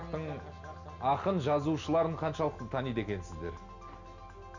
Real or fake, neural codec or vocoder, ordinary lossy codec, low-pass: real; none; none; 7.2 kHz